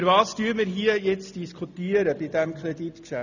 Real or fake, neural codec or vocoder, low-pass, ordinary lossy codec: real; none; 7.2 kHz; none